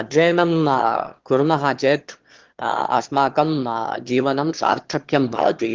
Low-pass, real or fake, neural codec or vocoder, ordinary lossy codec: 7.2 kHz; fake; autoencoder, 22.05 kHz, a latent of 192 numbers a frame, VITS, trained on one speaker; Opus, 16 kbps